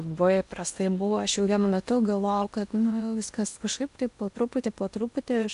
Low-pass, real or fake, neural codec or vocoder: 10.8 kHz; fake; codec, 16 kHz in and 24 kHz out, 0.8 kbps, FocalCodec, streaming, 65536 codes